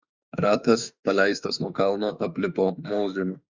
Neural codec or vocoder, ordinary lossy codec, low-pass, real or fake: autoencoder, 48 kHz, 32 numbers a frame, DAC-VAE, trained on Japanese speech; Opus, 64 kbps; 7.2 kHz; fake